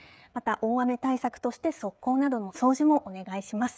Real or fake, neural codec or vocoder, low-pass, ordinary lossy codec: fake; codec, 16 kHz, 16 kbps, FreqCodec, smaller model; none; none